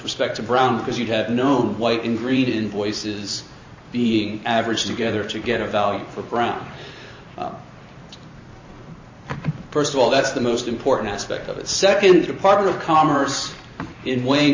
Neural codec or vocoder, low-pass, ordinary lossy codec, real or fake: vocoder, 44.1 kHz, 128 mel bands every 512 samples, BigVGAN v2; 7.2 kHz; MP3, 32 kbps; fake